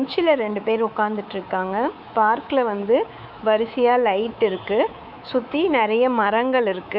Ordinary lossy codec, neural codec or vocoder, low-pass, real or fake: none; codec, 24 kHz, 3.1 kbps, DualCodec; 5.4 kHz; fake